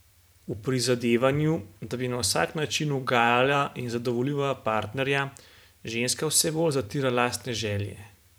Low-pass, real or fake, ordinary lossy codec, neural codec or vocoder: none; fake; none; vocoder, 44.1 kHz, 128 mel bands every 512 samples, BigVGAN v2